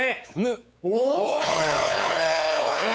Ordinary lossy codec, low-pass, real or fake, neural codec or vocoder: none; none; fake; codec, 16 kHz, 4 kbps, X-Codec, WavLM features, trained on Multilingual LibriSpeech